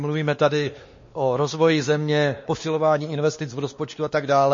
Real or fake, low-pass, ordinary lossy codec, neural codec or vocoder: fake; 7.2 kHz; MP3, 32 kbps; codec, 16 kHz, 2 kbps, X-Codec, WavLM features, trained on Multilingual LibriSpeech